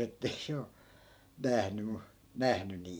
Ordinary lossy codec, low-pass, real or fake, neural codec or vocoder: none; none; fake; vocoder, 44.1 kHz, 128 mel bands every 512 samples, BigVGAN v2